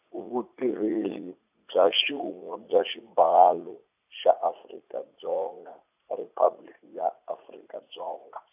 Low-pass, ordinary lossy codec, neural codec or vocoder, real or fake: 3.6 kHz; AAC, 32 kbps; vocoder, 44.1 kHz, 80 mel bands, Vocos; fake